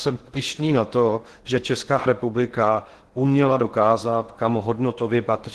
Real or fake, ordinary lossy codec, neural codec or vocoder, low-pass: fake; Opus, 16 kbps; codec, 16 kHz in and 24 kHz out, 0.8 kbps, FocalCodec, streaming, 65536 codes; 10.8 kHz